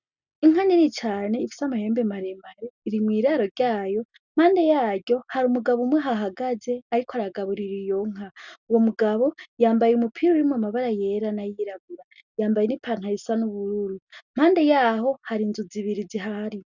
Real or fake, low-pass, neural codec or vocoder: real; 7.2 kHz; none